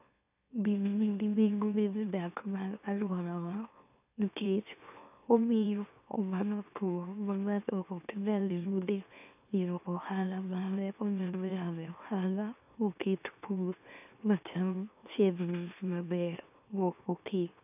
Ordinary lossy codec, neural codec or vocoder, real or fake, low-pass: none; autoencoder, 44.1 kHz, a latent of 192 numbers a frame, MeloTTS; fake; 3.6 kHz